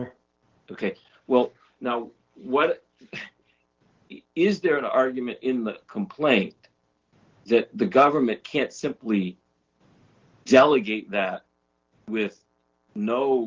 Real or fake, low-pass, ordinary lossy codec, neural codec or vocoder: real; 7.2 kHz; Opus, 16 kbps; none